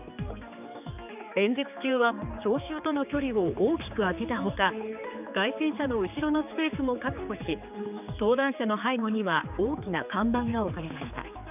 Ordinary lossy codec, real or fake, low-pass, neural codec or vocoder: none; fake; 3.6 kHz; codec, 16 kHz, 4 kbps, X-Codec, HuBERT features, trained on general audio